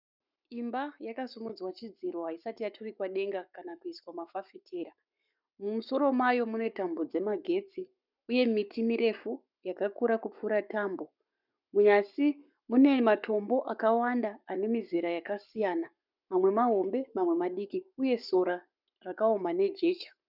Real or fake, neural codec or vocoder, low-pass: fake; codec, 44.1 kHz, 7.8 kbps, Pupu-Codec; 5.4 kHz